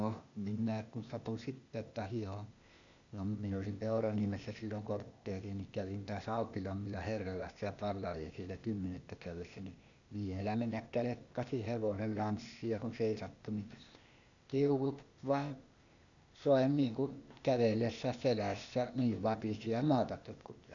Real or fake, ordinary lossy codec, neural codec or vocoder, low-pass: fake; none; codec, 16 kHz, 0.8 kbps, ZipCodec; 7.2 kHz